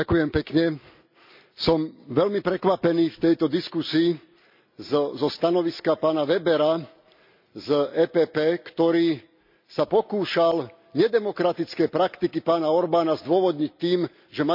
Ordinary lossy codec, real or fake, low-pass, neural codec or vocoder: MP3, 48 kbps; real; 5.4 kHz; none